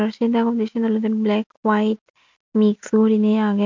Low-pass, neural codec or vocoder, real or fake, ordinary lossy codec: 7.2 kHz; none; real; MP3, 64 kbps